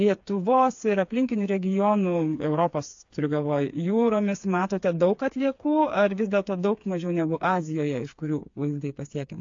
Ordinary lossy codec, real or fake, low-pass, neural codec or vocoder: AAC, 48 kbps; fake; 7.2 kHz; codec, 16 kHz, 4 kbps, FreqCodec, smaller model